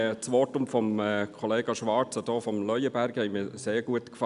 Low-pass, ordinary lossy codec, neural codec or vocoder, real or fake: 10.8 kHz; none; none; real